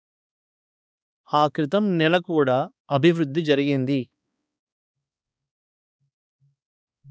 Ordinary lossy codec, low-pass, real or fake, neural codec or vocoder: none; none; fake; codec, 16 kHz, 2 kbps, X-Codec, HuBERT features, trained on balanced general audio